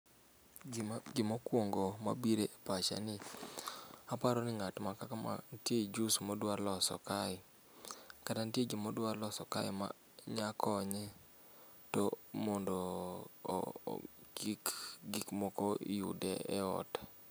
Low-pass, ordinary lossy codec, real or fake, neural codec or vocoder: none; none; real; none